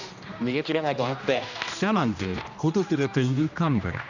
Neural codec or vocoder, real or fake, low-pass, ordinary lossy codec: codec, 16 kHz, 1 kbps, X-Codec, HuBERT features, trained on general audio; fake; 7.2 kHz; none